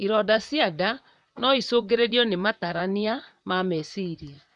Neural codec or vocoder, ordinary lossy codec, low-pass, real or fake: vocoder, 44.1 kHz, 128 mel bands, Pupu-Vocoder; none; 10.8 kHz; fake